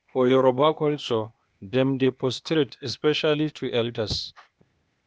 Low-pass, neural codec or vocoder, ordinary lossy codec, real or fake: none; codec, 16 kHz, 0.8 kbps, ZipCodec; none; fake